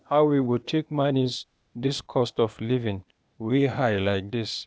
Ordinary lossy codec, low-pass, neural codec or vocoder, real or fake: none; none; codec, 16 kHz, 0.8 kbps, ZipCodec; fake